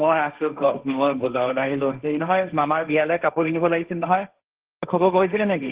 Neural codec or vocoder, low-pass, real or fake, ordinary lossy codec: codec, 16 kHz, 1.1 kbps, Voila-Tokenizer; 3.6 kHz; fake; Opus, 16 kbps